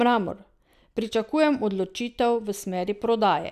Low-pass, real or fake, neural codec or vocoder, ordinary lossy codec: 14.4 kHz; real; none; none